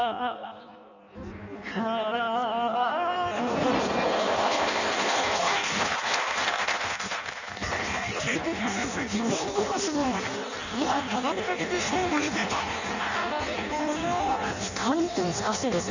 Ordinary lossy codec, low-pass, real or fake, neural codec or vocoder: none; 7.2 kHz; fake; codec, 16 kHz in and 24 kHz out, 0.6 kbps, FireRedTTS-2 codec